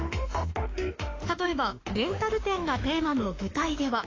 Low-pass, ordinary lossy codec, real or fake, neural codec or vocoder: 7.2 kHz; AAC, 32 kbps; fake; autoencoder, 48 kHz, 32 numbers a frame, DAC-VAE, trained on Japanese speech